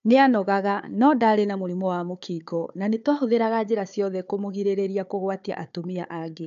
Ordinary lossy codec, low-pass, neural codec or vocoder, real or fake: AAC, 64 kbps; 7.2 kHz; codec, 16 kHz, 4 kbps, FunCodec, trained on Chinese and English, 50 frames a second; fake